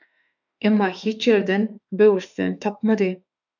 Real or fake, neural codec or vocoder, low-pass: fake; autoencoder, 48 kHz, 32 numbers a frame, DAC-VAE, trained on Japanese speech; 7.2 kHz